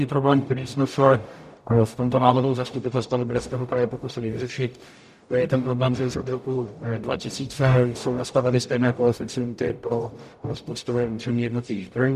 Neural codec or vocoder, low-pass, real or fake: codec, 44.1 kHz, 0.9 kbps, DAC; 14.4 kHz; fake